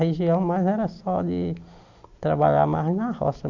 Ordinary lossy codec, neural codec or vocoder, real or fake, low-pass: Opus, 64 kbps; none; real; 7.2 kHz